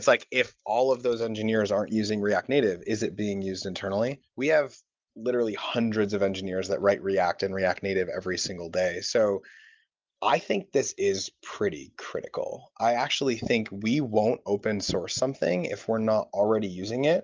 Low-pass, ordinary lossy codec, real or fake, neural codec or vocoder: 7.2 kHz; Opus, 24 kbps; real; none